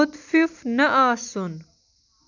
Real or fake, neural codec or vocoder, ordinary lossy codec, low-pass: real; none; none; 7.2 kHz